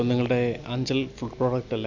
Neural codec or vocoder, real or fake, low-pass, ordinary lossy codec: none; real; 7.2 kHz; none